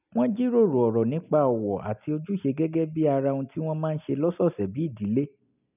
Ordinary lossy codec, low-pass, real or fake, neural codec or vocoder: none; 3.6 kHz; real; none